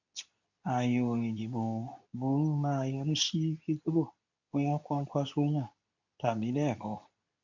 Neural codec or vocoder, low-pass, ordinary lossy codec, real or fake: codec, 24 kHz, 0.9 kbps, WavTokenizer, medium speech release version 2; 7.2 kHz; none; fake